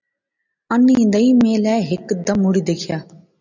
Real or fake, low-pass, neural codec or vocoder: real; 7.2 kHz; none